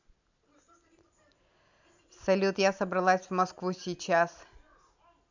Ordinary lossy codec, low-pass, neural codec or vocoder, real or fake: none; 7.2 kHz; none; real